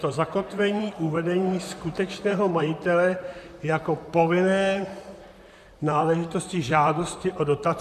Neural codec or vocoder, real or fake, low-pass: vocoder, 44.1 kHz, 128 mel bands, Pupu-Vocoder; fake; 14.4 kHz